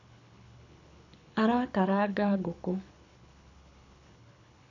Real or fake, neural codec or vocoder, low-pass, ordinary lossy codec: fake; codec, 24 kHz, 6 kbps, HILCodec; 7.2 kHz; none